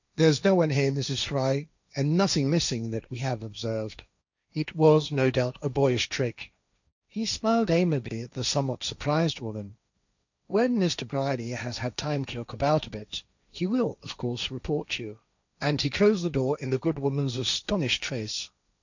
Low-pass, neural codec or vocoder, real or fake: 7.2 kHz; codec, 16 kHz, 1.1 kbps, Voila-Tokenizer; fake